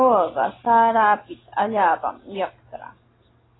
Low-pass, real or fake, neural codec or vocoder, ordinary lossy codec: 7.2 kHz; real; none; AAC, 16 kbps